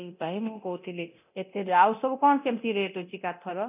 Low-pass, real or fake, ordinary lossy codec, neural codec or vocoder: 3.6 kHz; fake; none; codec, 24 kHz, 0.9 kbps, DualCodec